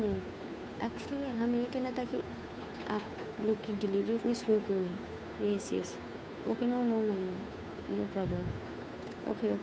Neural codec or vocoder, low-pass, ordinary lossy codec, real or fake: codec, 16 kHz, 2 kbps, FunCodec, trained on Chinese and English, 25 frames a second; none; none; fake